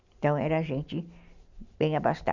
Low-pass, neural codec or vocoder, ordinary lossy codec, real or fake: 7.2 kHz; none; Opus, 64 kbps; real